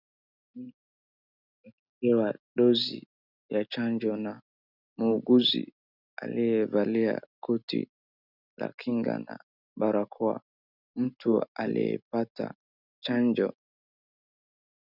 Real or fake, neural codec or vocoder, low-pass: real; none; 5.4 kHz